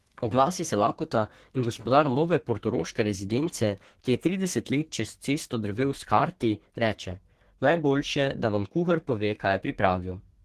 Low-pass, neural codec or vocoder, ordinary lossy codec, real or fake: 14.4 kHz; codec, 32 kHz, 1.9 kbps, SNAC; Opus, 16 kbps; fake